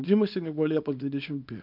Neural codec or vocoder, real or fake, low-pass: codec, 24 kHz, 0.9 kbps, WavTokenizer, small release; fake; 5.4 kHz